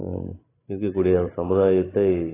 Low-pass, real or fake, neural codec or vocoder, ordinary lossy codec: 3.6 kHz; fake; codec, 16 kHz, 16 kbps, FreqCodec, larger model; AAC, 16 kbps